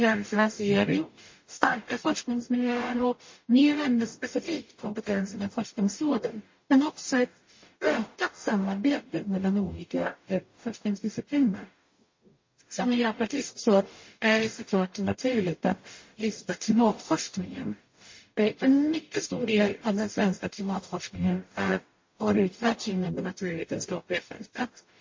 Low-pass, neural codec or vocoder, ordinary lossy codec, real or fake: 7.2 kHz; codec, 44.1 kHz, 0.9 kbps, DAC; MP3, 32 kbps; fake